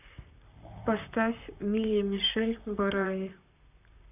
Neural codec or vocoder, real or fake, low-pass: vocoder, 44.1 kHz, 128 mel bands, Pupu-Vocoder; fake; 3.6 kHz